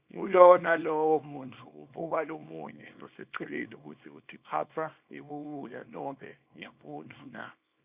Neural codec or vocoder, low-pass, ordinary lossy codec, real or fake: codec, 24 kHz, 0.9 kbps, WavTokenizer, small release; 3.6 kHz; Opus, 64 kbps; fake